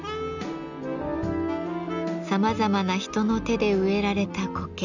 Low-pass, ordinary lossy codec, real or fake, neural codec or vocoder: 7.2 kHz; none; real; none